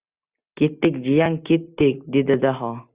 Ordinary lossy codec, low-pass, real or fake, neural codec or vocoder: Opus, 64 kbps; 3.6 kHz; real; none